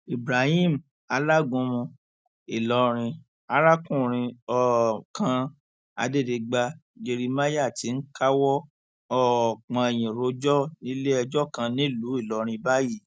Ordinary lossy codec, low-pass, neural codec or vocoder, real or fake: none; none; none; real